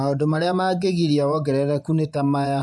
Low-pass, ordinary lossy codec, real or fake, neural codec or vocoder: none; none; fake; vocoder, 24 kHz, 100 mel bands, Vocos